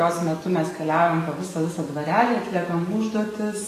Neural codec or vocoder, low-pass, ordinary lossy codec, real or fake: codec, 44.1 kHz, 7.8 kbps, DAC; 14.4 kHz; AAC, 48 kbps; fake